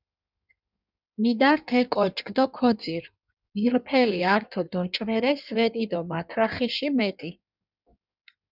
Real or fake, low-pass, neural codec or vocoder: fake; 5.4 kHz; codec, 16 kHz in and 24 kHz out, 1.1 kbps, FireRedTTS-2 codec